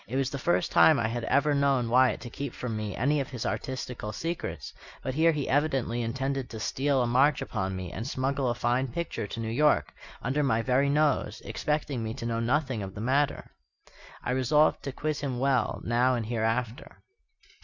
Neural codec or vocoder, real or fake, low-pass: none; real; 7.2 kHz